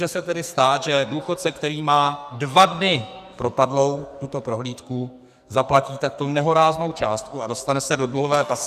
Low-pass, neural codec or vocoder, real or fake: 14.4 kHz; codec, 44.1 kHz, 2.6 kbps, SNAC; fake